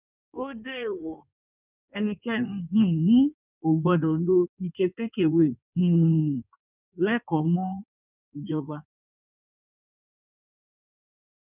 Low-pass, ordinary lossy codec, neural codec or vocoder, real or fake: 3.6 kHz; none; codec, 16 kHz in and 24 kHz out, 1.1 kbps, FireRedTTS-2 codec; fake